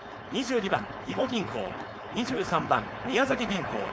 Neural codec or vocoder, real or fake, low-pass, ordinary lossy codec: codec, 16 kHz, 4.8 kbps, FACodec; fake; none; none